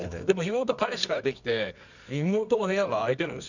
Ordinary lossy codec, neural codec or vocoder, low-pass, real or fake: none; codec, 24 kHz, 0.9 kbps, WavTokenizer, medium music audio release; 7.2 kHz; fake